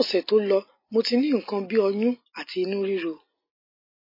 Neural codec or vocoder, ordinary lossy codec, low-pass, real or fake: none; MP3, 24 kbps; 5.4 kHz; real